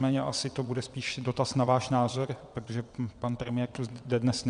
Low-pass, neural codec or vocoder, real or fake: 9.9 kHz; vocoder, 22.05 kHz, 80 mel bands, WaveNeXt; fake